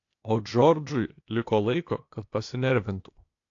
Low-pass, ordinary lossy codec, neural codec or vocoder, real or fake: 7.2 kHz; AAC, 48 kbps; codec, 16 kHz, 0.8 kbps, ZipCodec; fake